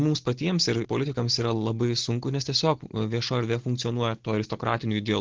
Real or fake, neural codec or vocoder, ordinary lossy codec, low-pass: real; none; Opus, 16 kbps; 7.2 kHz